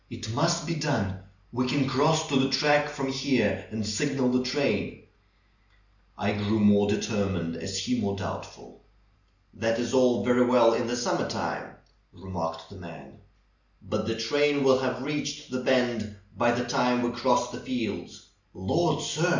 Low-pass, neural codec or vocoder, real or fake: 7.2 kHz; none; real